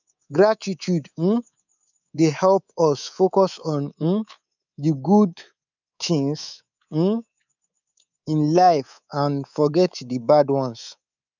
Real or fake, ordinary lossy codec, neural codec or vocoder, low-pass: fake; none; codec, 24 kHz, 3.1 kbps, DualCodec; 7.2 kHz